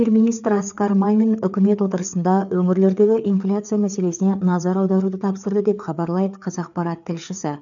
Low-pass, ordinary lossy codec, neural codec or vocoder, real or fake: 7.2 kHz; none; codec, 16 kHz, 4 kbps, FreqCodec, larger model; fake